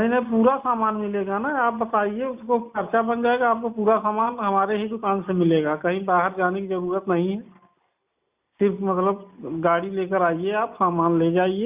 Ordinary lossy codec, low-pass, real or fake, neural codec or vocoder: Opus, 64 kbps; 3.6 kHz; real; none